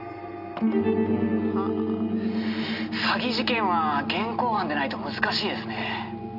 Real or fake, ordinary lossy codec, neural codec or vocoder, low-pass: real; none; none; 5.4 kHz